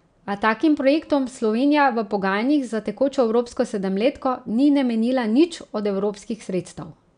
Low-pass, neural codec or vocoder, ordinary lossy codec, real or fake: 9.9 kHz; none; none; real